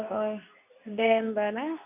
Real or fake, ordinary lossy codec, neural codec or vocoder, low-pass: fake; none; codec, 24 kHz, 0.9 kbps, WavTokenizer, medium speech release version 2; 3.6 kHz